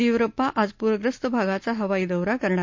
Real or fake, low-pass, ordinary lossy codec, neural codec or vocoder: real; 7.2 kHz; MP3, 48 kbps; none